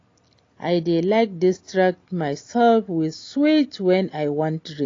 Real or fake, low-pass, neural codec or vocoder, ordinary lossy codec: real; 7.2 kHz; none; AAC, 32 kbps